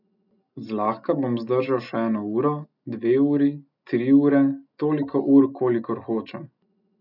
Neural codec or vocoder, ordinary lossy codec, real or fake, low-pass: none; none; real; 5.4 kHz